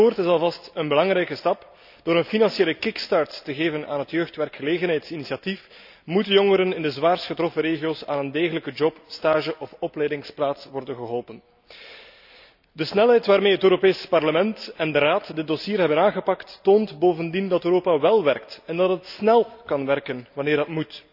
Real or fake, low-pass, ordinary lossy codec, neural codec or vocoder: real; 5.4 kHz; none; none